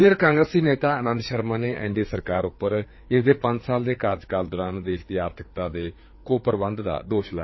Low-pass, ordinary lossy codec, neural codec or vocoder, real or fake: 7.2 kHz; MP3, 24 kbps; codec, 16 kHz, 4 kbps, FreqCodec, larger model; fake